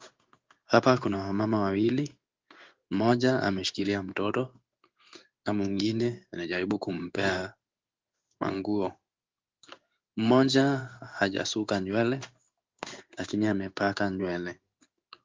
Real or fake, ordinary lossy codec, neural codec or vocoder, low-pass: fake; Opus, 32 kbps; codec, 16 kHz in and 24 kHz out, 1 kbps, XY-Tokenizer; 7.2 kHz